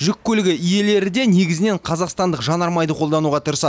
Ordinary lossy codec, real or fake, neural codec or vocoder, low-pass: none; real; none; none